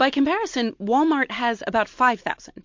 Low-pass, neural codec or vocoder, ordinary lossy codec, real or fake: 7.2 kHz; none; MP3, 48 kbps; real